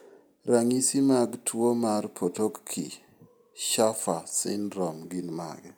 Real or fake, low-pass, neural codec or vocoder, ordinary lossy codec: real; none; none; none